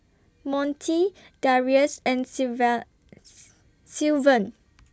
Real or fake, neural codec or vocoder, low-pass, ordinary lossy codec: real; none; none; none